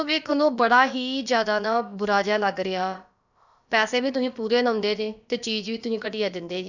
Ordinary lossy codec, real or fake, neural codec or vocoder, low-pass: none; fake; codec, 16 kHz, about 1 kbps, DyCAST, with the encoder's durations; 7.2 kHz